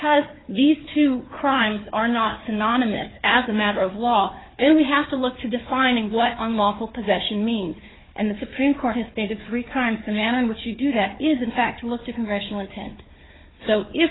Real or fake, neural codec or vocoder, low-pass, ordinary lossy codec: fake; codec, 16 kHz, 4 kbps, FunCodec, trained on Chinese and English, 50 frames a second; 7.2 kHz; AAC, 16 kbps